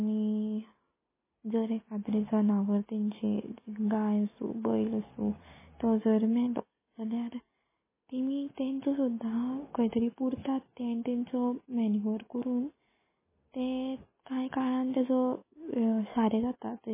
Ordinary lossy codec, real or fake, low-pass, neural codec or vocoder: MP3, 16 kbps; real; 3.6 kHz; none